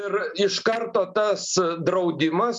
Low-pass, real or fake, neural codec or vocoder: 10.8 kHz; real; none